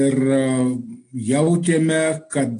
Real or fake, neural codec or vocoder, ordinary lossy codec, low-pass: real; none; AAC, 48 kbps; 9.9 kHz